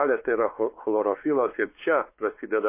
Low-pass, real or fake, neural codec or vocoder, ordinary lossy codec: 3.6 kHz; fake; codec, 16 kHz, 4 kbps, X-Codec, WavLM features, trained on Multilingual LibriSpeech; MP3, 24 kbps